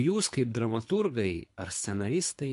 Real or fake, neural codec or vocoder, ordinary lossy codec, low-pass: fake; codec, 24 kHz, 3 kbps, HILCodec; MP3, 64 kbps; 10.8 kHz